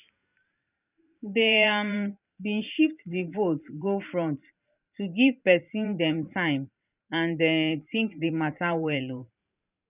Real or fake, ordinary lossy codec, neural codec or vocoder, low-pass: fake; none; vocoder, 44.1 kHz, 128 mel bands every 512 samples, BigVGAN v2; 3.6 kHz